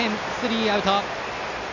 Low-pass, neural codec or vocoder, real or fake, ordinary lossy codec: 7.2 kHz; none; real; none